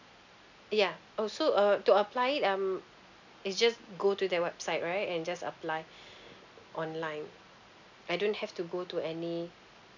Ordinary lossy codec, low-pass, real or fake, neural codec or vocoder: none; 7.2 kHz; real; none